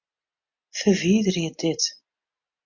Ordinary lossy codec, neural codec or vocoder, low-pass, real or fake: MP3, 48 kbps; none; 7.2 kHz; real